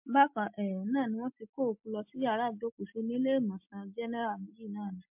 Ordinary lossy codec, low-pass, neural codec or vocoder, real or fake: MP3, 32 kbps; 3.6 kHz; none; real